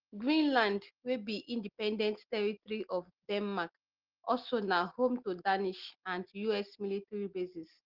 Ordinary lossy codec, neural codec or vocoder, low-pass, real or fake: Opus, 16 kbps; none; 5.4 kHz; real